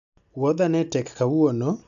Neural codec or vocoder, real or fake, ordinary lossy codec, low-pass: none; real; none; 7.2 kHz